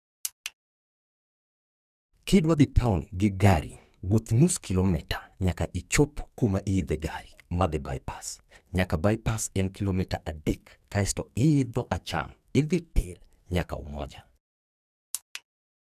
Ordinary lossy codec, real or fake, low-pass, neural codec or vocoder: none; fake; 14.4 kHz; codec, 44.1 kHz, 2.6 kbps, SNAC